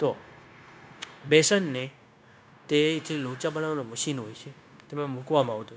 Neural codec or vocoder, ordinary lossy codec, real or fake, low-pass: codec, 16 kHz, 0.9 kbps, LongCat-Audio-Codec; none; fake; none